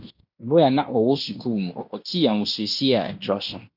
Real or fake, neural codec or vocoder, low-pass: fake; codec, 16 kHz in and 24 kHz out, 0.9 kbps, LongCat-Audio-Codec, fine tuned four codebook decoder; 5.4 kHz